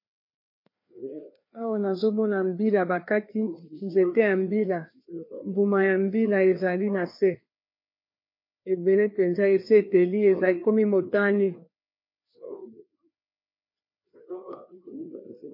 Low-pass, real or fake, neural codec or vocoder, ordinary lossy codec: 5.4 kHz; fake; codec, 16 kHz, 2 kbps, FreqCodec, larger model; MP3, 32 kbps